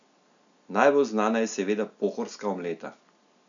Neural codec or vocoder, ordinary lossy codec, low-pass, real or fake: none; none; 7.2 kHz; real